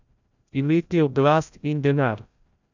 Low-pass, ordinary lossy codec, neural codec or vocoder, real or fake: 7.2 kHz; none; codec, 16 kHz, 0.5 kbps, FreqCodec, larger model; fake